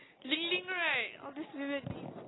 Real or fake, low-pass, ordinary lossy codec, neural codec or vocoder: real; 7.2 kHz; AAC, 16 kbps; none